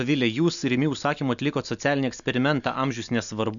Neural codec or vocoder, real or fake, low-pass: none; real; 7.2 kHz